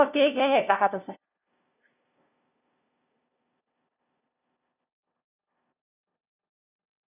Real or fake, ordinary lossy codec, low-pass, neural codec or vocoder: fake; none; 3.6 kHz; codec, 16 kHz, 0.5 kbps, FunCodec, trained on LibriTTS, 25 frames a second